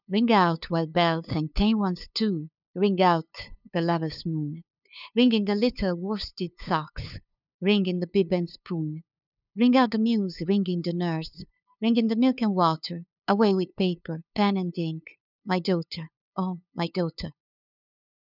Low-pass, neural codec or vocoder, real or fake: 5.4 kHz; codec, 16 kHz, 2 kbps, FunCodec, trained on LibriTTS, 25 frames a second; fake